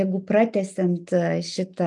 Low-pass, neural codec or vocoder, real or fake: 10.8 kHz; none; real